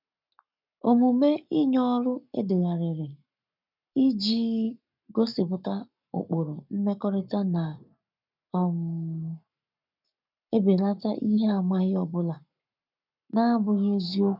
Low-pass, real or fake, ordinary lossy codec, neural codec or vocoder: 5.4 kHz; fake; none; codec, 44.1 kHz, 7.8 kbps, Pupu-Codec